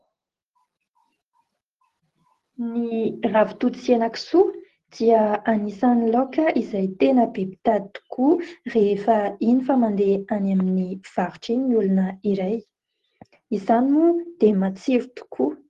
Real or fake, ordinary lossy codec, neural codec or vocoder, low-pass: real; Opus, 16 kbps; none; 14.4 kHz